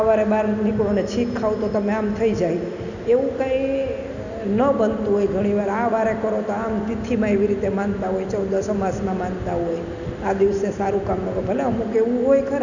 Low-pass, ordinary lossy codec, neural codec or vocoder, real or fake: 7.2 kHz; none; none; real